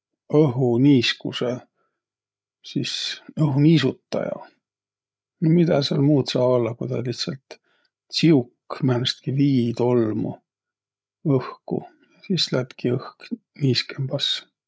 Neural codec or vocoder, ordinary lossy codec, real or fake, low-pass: codec, 16 kHz, 8 kbps, FreqCodec, larger model; none; fake; none